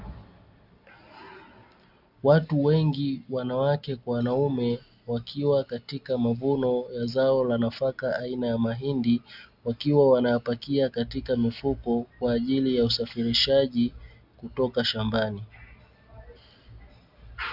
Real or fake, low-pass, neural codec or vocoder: real; 5.4 kHz; none